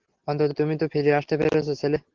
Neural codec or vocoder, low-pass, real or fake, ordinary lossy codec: none; 7.2 kHz; real; Opus, 16 kbps